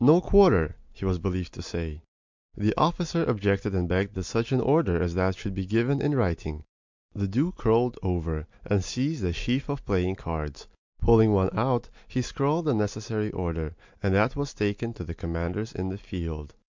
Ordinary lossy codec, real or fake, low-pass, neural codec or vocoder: MP3, 64 kbps; real; 7.2 kHz; none